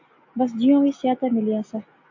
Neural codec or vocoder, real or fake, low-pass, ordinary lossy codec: none; real; 7.2 kHz; AAC, 48 kbps